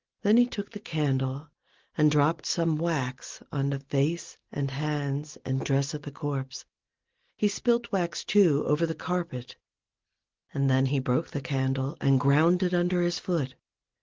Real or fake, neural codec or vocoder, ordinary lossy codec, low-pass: real; none; Opus, 16 kbps; 7.2 kHz